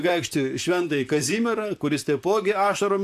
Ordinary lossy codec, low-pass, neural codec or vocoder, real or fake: AAC, 96 kbps; 14.4 kHz; vocoder, 44.1 kHz, 128 mel bands, Pupu-Vocoder; fake